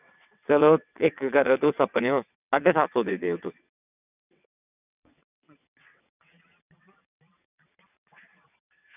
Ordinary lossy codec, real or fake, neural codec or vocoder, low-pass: none; fake; vocoder, 22.05 kHz, 80 mel bands, WaveNeXt; 3.6 kHz